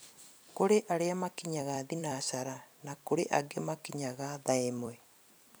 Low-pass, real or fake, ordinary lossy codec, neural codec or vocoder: none; fake; none; vocoder, 44.1 kHz, 128 mel bands every 256 samples, BigVGAN v2